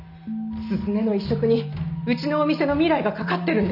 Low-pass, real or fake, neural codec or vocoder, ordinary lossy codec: 5.4 kHz; real; none; none